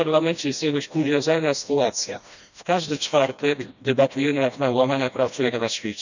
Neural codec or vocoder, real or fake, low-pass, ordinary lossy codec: codec, 16 kHz, 1 kbps, FreqCodec, smaller model; fake; 7.2 kHz; none